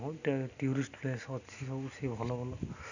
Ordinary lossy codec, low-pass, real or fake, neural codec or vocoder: none; 7.2 kHz; real; none